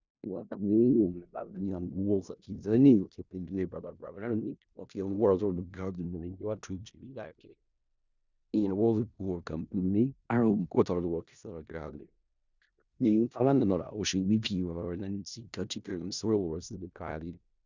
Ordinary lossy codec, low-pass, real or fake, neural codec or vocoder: Opus, 64 kbps; 7.2 kHz; fake; codec, 16 kHz in and 24 kHz out, 0.4 kbps, LongCat-Audio-Codec, four codebook decoder